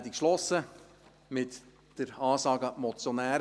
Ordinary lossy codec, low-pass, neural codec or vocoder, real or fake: none; none; none; real